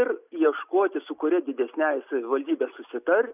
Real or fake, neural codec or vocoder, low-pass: real; none; 3.6 kHz